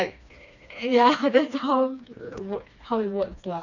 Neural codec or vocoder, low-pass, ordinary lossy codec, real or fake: codec, 16 kHz, 2 kbps, FreqCodec, smaller model; 7.2 kHz; none; fake